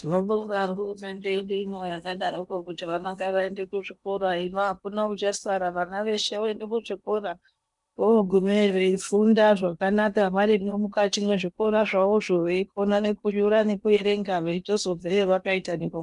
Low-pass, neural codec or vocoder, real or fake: 10.8 kHz; codec, 16 kHz in and 24 kHz out, 0.8 kbps, FocalCodec, streaming, 65536 codes; fake